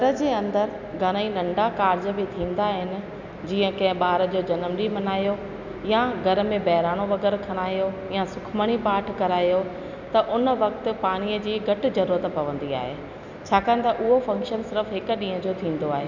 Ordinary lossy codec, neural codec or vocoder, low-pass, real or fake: none; none; 7.2 kHz; real